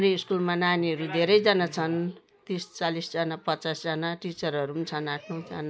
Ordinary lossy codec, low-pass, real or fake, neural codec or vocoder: none; none; real; none